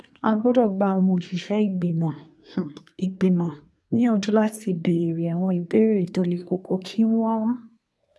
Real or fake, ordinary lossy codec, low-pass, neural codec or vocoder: fake; none; none; codec, 24 kHz, 1 kbps, SNAC